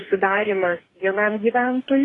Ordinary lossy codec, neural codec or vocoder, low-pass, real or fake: AAC, 32 kbps; codec, 44.1 kHz, 2.6 kbps, DAC; 10.8 kHz; fake